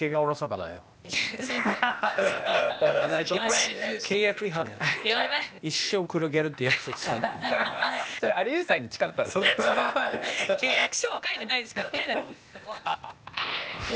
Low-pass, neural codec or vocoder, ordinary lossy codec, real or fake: none; codec, 16 kHz, 0.8 kbps, ZipCodec; none; fake